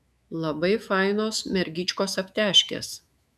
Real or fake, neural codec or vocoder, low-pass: fake; autoencoder, 48 kHz, 128 numbers a frame, DAC-VAE, trained on Japanese speech; 14.4 kHz